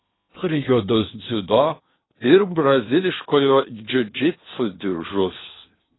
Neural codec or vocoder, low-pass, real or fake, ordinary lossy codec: codec, 16 kHz in and 24 kHz out, 0.8 kbps, FocalCodec, streaming, 65536 codes; 7.2 kHz; fake; AAC, 16 kbps